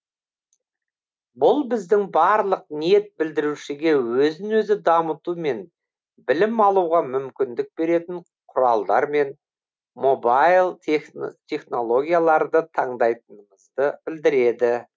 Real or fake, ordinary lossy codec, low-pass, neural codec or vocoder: real; none; none; none